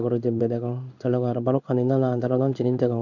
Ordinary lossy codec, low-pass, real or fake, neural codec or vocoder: none; 7.2 kHz; fake; codec, 16 kHz in and 24 kHz out, 1 kbps, XY-Tokenizer